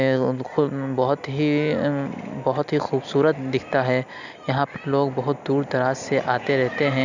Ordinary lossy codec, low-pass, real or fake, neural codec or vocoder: none; 7.2 kHz; real; none